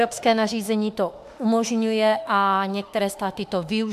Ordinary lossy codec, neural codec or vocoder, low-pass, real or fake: AAC, 96 kbps; autoencoder, 48 kHz, 32 numbers a frame, DAC-VAE, trained on Japanese speech; 14.4 kHz; fake